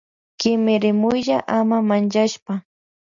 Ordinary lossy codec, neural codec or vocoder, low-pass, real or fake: MP3, 96 kbps; none; 7.2 kHz; real